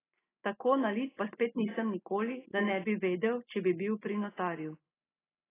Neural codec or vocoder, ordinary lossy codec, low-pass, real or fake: none; AAC, 16 kbps; 3.6 kHz; real